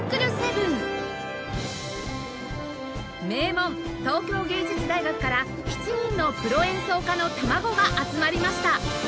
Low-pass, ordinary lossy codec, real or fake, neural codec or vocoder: none; none; real; none